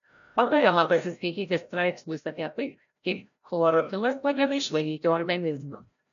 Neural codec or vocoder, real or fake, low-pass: codec, 16 kHz, 0.5 kbps, FreqCodec, larger model; fake; 7.2 kHz